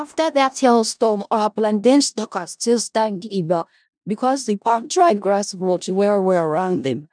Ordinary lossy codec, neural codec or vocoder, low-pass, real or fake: none; codec, 16 kHz in and 24 kHz out, 0.4 kbps, LongCat-Audio-Codec, four codebook decoder; 9.9 kHz; fake